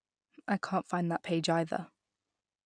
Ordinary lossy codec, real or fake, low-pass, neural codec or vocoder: none; real; 9.9 kHz; none